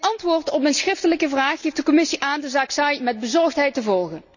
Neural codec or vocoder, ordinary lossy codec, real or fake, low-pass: none; none; real; 7.2 kHz